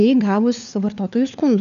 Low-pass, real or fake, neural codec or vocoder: 7.2 kHz; fake; codec, 16 kHz, 4 kbps, FunCodec, trained on LibriTTS, 50 frames a second